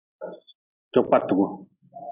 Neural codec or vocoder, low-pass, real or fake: none; 3.6 kHz; real